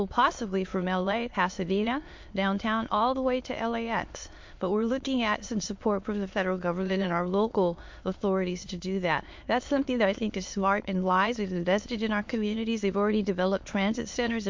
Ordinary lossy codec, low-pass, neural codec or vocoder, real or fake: MP3, 48 kbps; 7.2 kHz; autoencoder, 22.05 kHz, a latent of 192 numbers a frame, VITS, trained on many speakers; fake